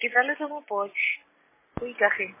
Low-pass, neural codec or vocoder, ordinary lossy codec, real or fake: 3.6 kHz; none; MP3, 16 kbps; real